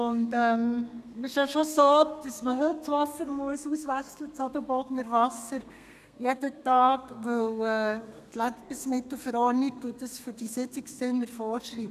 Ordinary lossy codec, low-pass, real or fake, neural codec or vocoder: none; 14.4 kHz; fake; codec, 32 kHz, 1.9 kbps, SNAC